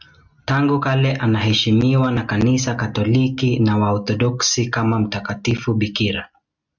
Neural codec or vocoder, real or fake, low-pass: none; real; 7.2 kHz